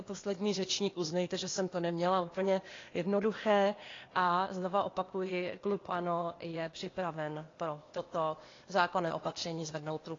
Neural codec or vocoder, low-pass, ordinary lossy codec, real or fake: codec, 16 kHz, 0.8 kbps, ZipCodec; 7.2 kHz; AAC, 32 kbps; fake